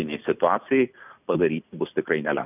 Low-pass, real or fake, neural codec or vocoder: 3.6 kHz; real; none